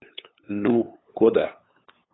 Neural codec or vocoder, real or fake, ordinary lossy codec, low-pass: codec, 16 kHz, 8 kbps, FunCodec, trained on LibriTTS, 25 frames a second; fake; AAC, 16 kbps; 7.2 kHz